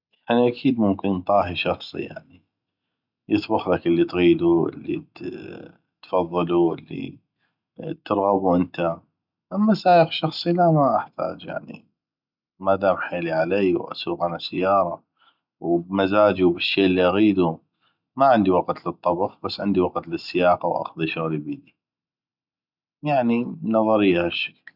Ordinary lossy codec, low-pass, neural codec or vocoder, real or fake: none; 5.4 kHz; none; real